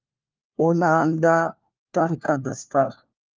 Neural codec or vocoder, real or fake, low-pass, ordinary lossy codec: codec, 16 kHz, 1 kbps, FunCodec, trained on LibriTTS, 50 frames a second; fake; 7.2 kHz; Opus, 24 kbps